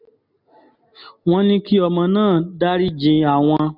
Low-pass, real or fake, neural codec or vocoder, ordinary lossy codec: 5.4 kHz; real; none; none